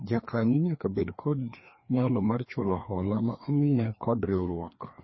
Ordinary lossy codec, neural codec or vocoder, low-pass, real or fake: MP3, 24 kbps; codec, 16 kHz, 2 kbps, FreqCodec, larger model; 7.2 kHz; fake